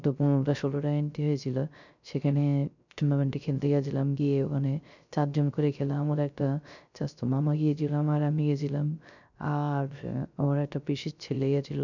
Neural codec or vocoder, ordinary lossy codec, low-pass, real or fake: codec, 16 kHz, 0.3 kbps, FocalCodec; none; 7.2 kHz; fake